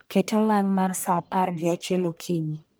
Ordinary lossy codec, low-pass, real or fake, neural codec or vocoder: none; none; fake; codec, 44.1 kHz, 1.7 kbps, Pupu-Codec